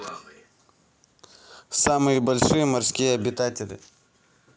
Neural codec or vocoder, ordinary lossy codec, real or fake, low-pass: none; none; real; none